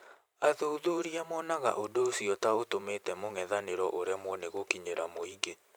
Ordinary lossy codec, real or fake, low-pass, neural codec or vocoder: none; fake; none; vocoder, 44.1 kHz, 128 mel bands every 512 samples, BigVGAN v2